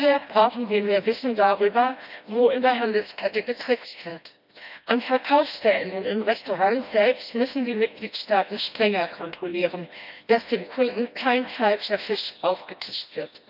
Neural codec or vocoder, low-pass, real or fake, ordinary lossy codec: codec, 16 kHz, 1 kbps, FreqCodec, smaller model; 5.4 kHz; fake; none